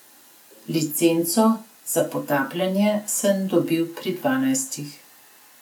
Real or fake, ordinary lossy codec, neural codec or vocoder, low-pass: real; none; none; none